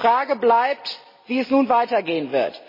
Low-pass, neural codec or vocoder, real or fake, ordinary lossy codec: 5.4 kHz; none; real; MP3, 24 kbps